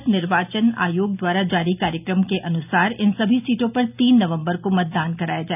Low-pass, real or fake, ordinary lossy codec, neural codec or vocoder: 3.6 kHz; real; none; none